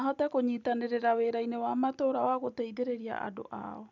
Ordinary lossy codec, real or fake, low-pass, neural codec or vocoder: none; real; 7.2 kHz; none